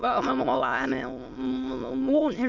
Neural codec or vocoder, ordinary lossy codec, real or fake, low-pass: autoencoder, 22.05 kHz, a latent of 192 numbers a frame, VITS, trained on many speakers; none; fake; 7.2 kHz